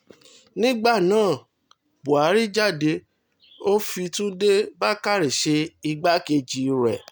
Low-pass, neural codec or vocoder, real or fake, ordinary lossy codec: none; none; real; none